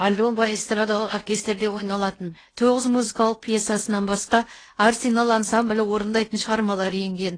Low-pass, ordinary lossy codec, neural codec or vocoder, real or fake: 9.9 kHz; AAC, 32 kbps; codec, 16 kHz in and 24 kHz out, 0.8 kbps, FocalCodec, streaming, 65536 codes; fake